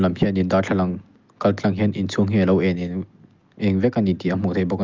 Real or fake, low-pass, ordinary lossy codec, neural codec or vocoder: real; 7.2 kHz; Opus, 32 kbps; none